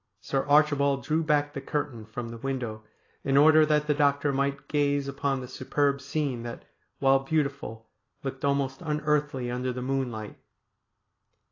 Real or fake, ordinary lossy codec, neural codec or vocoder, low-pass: real; AAC, 32 kbps; none; 7.2 kHz